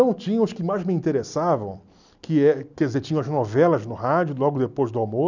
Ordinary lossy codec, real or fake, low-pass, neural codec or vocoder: none; real; 7.2 kHz; none